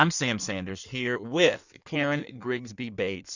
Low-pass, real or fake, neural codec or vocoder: 7.2 kHz; fake; codec, 16 kHz in and 24 kHz out, 1.1 kbps, FireRedTTS-2 codec